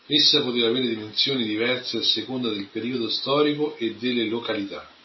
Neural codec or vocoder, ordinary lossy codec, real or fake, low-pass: none; MP3, 24 kbps; real; 7.2 kHz